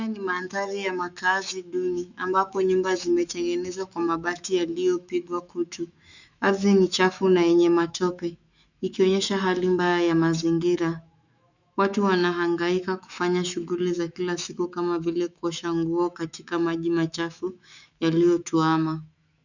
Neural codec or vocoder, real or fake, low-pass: autoencoder, 48 kHz, 128 numbers a frame, DAC-VAE, trained on Japanese speech; fake; 7.2 kHz